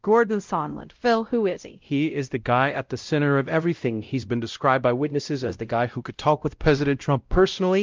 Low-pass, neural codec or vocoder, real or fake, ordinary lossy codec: 7.2 kHz; codec, 16 kHz, 0.5 kbps, X-Codec, WavLM features, trained on Multilingual LibriSpeech; fake; Opus, 24 kbps